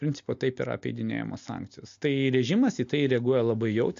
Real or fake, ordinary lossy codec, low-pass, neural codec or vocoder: real; MP3, 48 kbps; 7.2 kHz; none